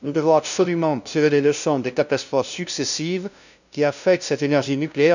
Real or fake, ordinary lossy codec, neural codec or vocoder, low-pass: fake; none; codec, 16 kHz, 0.5 kbps, FunCodec, trained on LibriTTS, 25 frames a second; 7.2 kHz